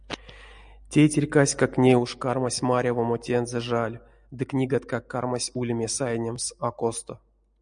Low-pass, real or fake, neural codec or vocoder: 9.9 kHz; real; none